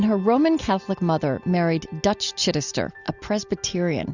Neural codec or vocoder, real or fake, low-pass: none; real; 7.2 kHz